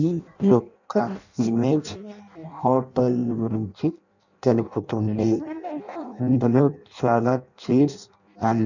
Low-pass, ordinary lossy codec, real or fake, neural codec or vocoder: 7.2 kHz; none; fake; codec, 16 kHz in and 24 kHz out, 0.6 kbps, FireRedTTS-2 codec